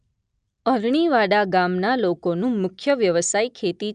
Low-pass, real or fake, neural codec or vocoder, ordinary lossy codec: 9.9 kHz; real; none; none